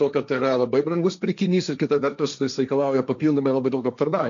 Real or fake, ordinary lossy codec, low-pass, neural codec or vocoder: fake; MP3, 64 kbps; 7.2 kHz; codec, 16 kHz, 1.1 kbps, Voila-Tokenizer